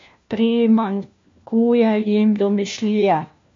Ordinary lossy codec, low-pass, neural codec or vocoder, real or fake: MP3, 48 kbps; 7.2 kHz; codec, 16 kHz, 1 kbps, FunCodec, trained on LibriTTS, 50 frames a second; fake